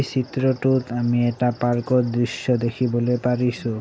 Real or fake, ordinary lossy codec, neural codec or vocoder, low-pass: real; none; none; none